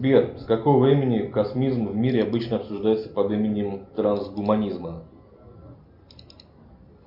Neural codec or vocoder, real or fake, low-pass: none; real; 5.4 kHz